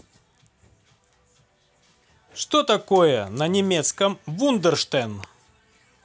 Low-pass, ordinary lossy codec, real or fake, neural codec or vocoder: none; none; real; none